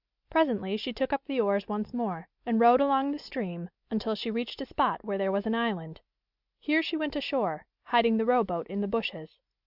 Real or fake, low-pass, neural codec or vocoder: real; 5.4 kHz; none